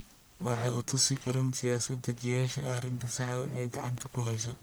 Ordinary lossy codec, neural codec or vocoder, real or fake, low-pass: none; codec, 44.1 kHz, 1.7 kbps, Pupu-Codec; fake; none